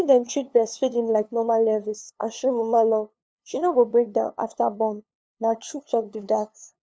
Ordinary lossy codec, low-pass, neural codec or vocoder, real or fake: none; none; codec, 16 kHz, 2 kbps, FunCodec, trained on LibriTTS, 25 frames a second; fake